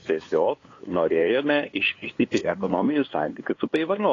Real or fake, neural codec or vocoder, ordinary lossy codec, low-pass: fake; codec, 16 kHz, 2 kbps, FunCodec, trained on LibriTTS, 25 frames a second; AAC, 32 kbps; 7.2 kHz